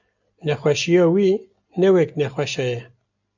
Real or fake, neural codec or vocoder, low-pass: real; none; 7.2 kHz